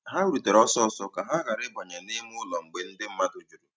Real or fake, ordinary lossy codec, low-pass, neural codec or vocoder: real; none; none; none